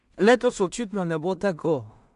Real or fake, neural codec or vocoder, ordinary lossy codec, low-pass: fake; codec, 16 kHz in and 24 kHz out, 0.4 kbps, LongCat-Audio-Codec, two codebook decoder; AAC, 96 kbps; 10.8 kHz